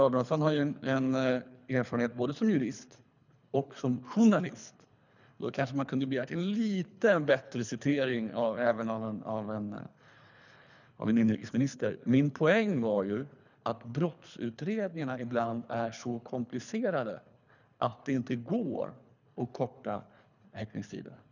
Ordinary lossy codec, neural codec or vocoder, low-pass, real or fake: none; codec, 24 kHz, 3 kbps, HILCodec; 7.2 kHz; fake